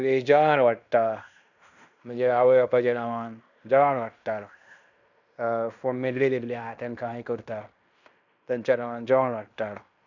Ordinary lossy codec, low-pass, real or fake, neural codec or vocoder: none; 7.2 kHz; fake; codec, 16 kHz in and 24 kHz out, 0.9 kbps, LongCat-Audio-Codec, fine tuned four codebook decoder